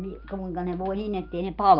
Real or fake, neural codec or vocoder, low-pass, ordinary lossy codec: real; none; 5.4 kHz; Opus, 32 kbps